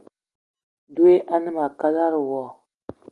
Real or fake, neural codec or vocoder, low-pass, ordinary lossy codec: real; none; 10.8 kHz; Opus, 32 kbps